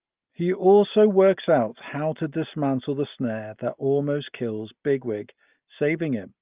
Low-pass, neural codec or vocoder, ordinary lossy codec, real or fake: 3.6 kHz; none; Opus, 32 kbps; real